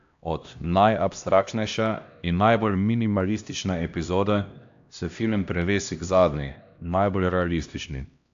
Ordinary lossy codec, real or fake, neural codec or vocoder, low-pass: AAC, 64 kbps; fake; codec, 16 kHz, 1 kbps, X-Codec, HuBERT features, trained on LibriSpeech; 7.2 kHz